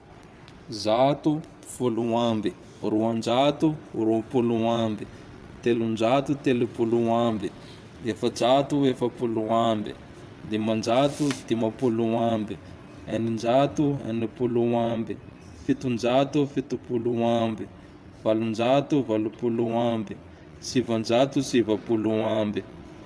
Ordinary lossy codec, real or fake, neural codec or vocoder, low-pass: none; fake; vocoder, 22.05 kHz, 80 mel bands, WaveNeXt; none